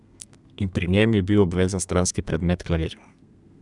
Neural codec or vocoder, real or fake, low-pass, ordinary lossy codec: codec, 32 kHz, 1.9 kbps, SNAC; fake; 10.8 kHz; none